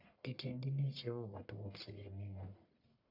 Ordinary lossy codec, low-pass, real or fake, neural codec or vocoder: none; 5.4 kHz; fake; codec, 44.1 kHz, 1.7 kbps, Pupu-Codec